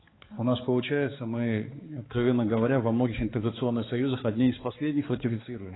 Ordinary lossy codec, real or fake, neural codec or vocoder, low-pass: AAC, 16 kbps; fake; codec, 16 kHz, 4 kbps, X-Codec, HuBERT features, trained on balanced general audio; 7.2 kHz